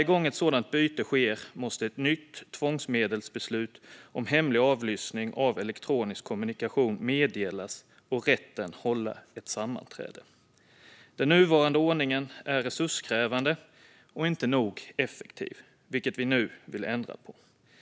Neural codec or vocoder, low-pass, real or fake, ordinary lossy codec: none; none; real; none